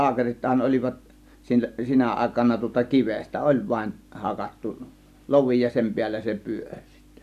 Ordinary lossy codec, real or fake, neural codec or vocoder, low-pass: none; real; none; 14.4 kHz